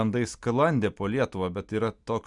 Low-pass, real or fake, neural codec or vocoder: 10.8 kHz; real; none